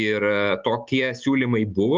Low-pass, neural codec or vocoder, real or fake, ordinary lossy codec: 7.2 kHz; none; real; Opus, 24 kbps